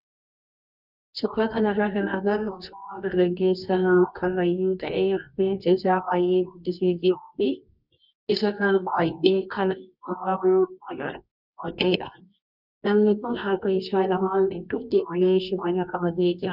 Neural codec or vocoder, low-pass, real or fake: codec, 24 kHz, 0.9 kbps, WavTokenizer, medium music audio release; 5.4 kHz; fake